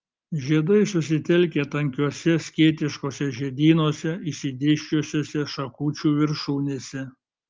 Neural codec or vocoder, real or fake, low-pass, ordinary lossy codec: none; real; 7.2 kHz; Opus, 32 kbps